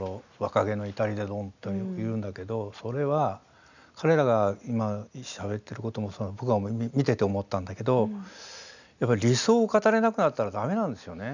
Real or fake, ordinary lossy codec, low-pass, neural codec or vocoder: real; none; 7.2 kHz; none